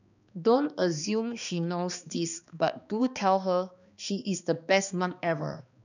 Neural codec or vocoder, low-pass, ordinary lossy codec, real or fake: codec, 16 kHz, 2 kbps, X-Codec, HuBERT features, trained on balanced general audio; 7.2 kHz; none; fake